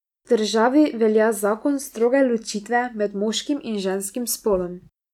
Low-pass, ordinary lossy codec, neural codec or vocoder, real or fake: 19.8 kHz; none; none; real